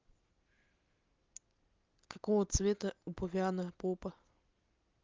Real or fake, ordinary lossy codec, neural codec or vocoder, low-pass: real; Opus, 32 kbps; none; 7.2 kHz